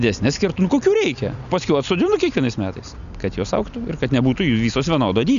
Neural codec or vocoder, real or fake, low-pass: none; real; 7.2 kHz